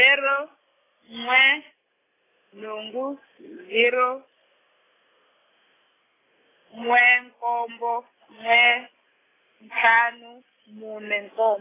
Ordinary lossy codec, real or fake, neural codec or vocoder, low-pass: AAC, 16 kbps; real; none; 3.6 kHz